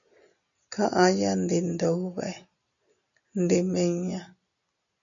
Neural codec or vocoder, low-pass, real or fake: none; 7.2 kHz; real